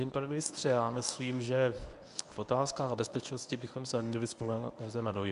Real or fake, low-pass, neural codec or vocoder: fake; 10.8 kHz; codec, 24 kHz, 0.9 kbps, WavTokenizer, medium speech release version 1